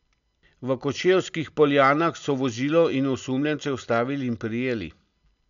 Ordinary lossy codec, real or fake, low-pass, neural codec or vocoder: none; real; 7.2 kHz; none